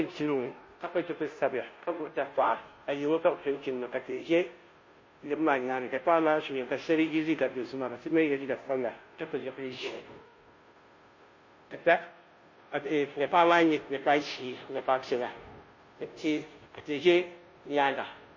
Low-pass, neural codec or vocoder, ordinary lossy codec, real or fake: 7.2 kHz; codec, 16 kHz, 0.5 kbps, FunCodec, trained on Chinese and English, 25 frames a second; MP3, 32 kbps; fake